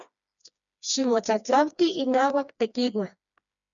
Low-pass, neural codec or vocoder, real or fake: 7.2 kHz; codec, 16 kHz, 2 kbps, FreqCodec, smaller model; fake